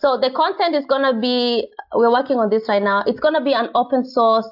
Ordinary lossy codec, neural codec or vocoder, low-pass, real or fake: AAC, 48 kbps; none; 5.4 kHz; real